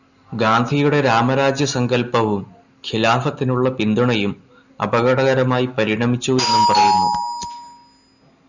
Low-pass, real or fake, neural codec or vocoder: 7.2 kHz; real; none